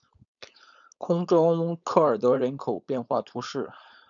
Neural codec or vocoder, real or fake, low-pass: codec, 16 kHz, 4.8 kbps, FACodec; fake; 7.2 kHz